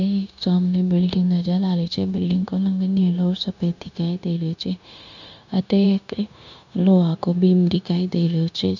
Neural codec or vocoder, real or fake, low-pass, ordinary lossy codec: codec, 16 kHz, 0.9 kbps, LongCat-Audio-Codec; fake; 7.2 kHz; none